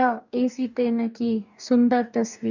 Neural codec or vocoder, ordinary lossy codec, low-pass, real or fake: codec, 44.1 kHz, 2.6 kbps, DAC; none; 7.2 kHz; fake